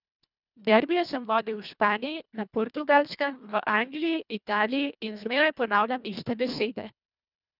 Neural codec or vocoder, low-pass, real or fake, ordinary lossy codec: codec, 24 kHz, 1.5 kbps, HILCodec; 5.4 kHz; fake; none